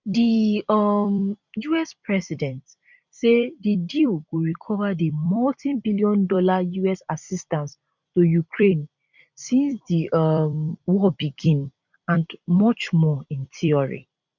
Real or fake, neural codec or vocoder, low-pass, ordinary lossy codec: fake; vocoder, 44.1 kHz, 128 mel bands every 256 samples, BigVGAN v2; 7.2 kHz; none